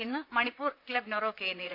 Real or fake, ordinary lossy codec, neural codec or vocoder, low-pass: fake; none; vocoder, 44.1 kHz, 128 mel bands, Pupu-Vocoder; 5.4 kHz